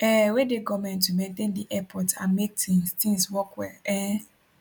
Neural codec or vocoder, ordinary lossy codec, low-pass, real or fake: none; none; none; real